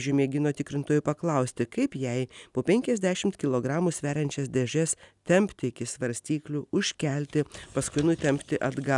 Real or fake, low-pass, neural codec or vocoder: real; 10.8 kHz; none